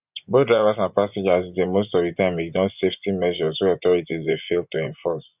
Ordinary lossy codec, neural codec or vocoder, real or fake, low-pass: none; vocoder, 24 kHz, 100 mel bands, Vocos; fake; 3.6 kHz